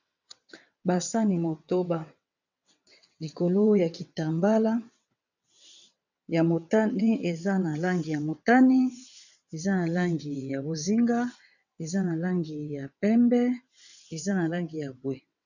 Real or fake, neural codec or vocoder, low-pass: fake; vocoder, 22.05 kHz, 80 mel bands, WaveNeXt; 7.2 kHz